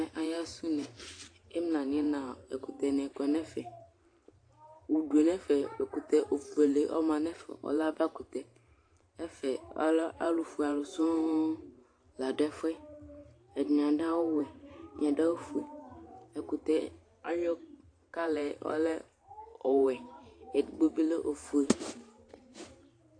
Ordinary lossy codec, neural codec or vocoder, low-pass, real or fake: AAC, 48 kbps; vocoder, 44.1 kHz, 128 mel bands every 512 samples, BigVGAN v2; 9.9 kHz; fake